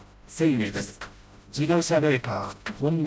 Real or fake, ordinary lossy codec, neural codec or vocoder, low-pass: fake; none; codec, 16 kHz, 0.5 kbps, FreqCodec, smaller model; none